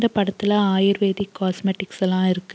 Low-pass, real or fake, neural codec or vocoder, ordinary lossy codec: none; real; none; none